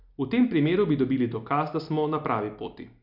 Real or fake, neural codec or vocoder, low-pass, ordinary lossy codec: real; none; 5.4 kHz; none